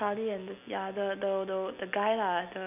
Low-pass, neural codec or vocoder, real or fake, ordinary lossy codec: 3.6 kHz; none; real; none